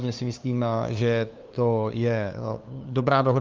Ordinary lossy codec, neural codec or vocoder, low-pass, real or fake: Opus, 24 kbps; codec, 16 kHz, 2 kbps, FunCodec, trained on LibriTTS, 25 frames a second; 7.2 kHz; fake